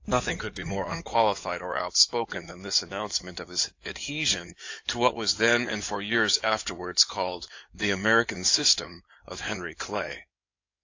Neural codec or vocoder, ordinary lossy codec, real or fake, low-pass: codec, 16 kHz in and 24 kHz out, 2.2 kbps, FireRedTTS-2 codec; AAC, 48 kbps; fake; 7.2 kHz